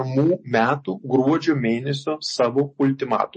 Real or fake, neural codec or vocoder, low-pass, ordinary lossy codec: real; none; 10.8 kHz; MP3, 32 kbps